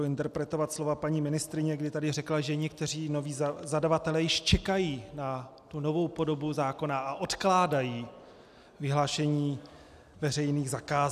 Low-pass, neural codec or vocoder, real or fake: 14.4 kHz; none; real